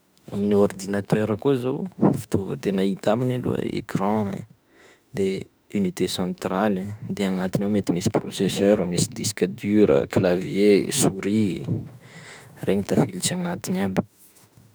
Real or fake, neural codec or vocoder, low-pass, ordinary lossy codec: fake; autoencoder, 48 kHz, 32 numbers a frame, DAC-VAE, trained on Japanese speech; none; none